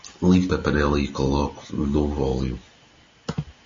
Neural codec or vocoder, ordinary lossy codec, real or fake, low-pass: none; MP3, 32 kbps; real; 7.2 kHz